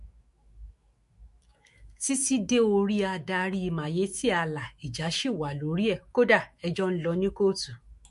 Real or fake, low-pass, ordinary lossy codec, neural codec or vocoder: fake; 14.4 kHz; MP3, 48 kbps; autoencoder, 48 kHz, 128 numbers a frame, DAC-VAE, trained on Japanese speech